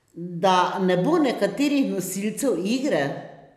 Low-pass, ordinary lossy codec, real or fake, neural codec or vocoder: 14.4 kHz; none; real; none